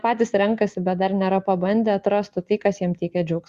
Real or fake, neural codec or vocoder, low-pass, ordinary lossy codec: real; none; 14.4 kHz; Opus, 64 kbps